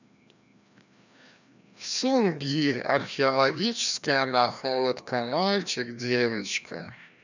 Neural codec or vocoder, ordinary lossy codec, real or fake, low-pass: codec, 16 kHz, 1 kbps, FreqCodec, larger model; none; fake; 7.2 kHz